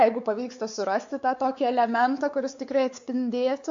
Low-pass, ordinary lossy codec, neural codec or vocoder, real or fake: 7.2 kHz; AAC, 64 kbps; codec, 16 kHz, 4 kbps, X-Codec, WavLM features, trained on Multilingual LibriSpeech; fake